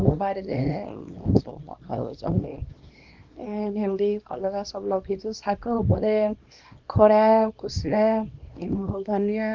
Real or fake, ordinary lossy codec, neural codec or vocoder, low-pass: fake; Opus, 32 kbps; codec, 24 kHz, 0.9 kbps, WavTokenizer, small release; 7.2 kHz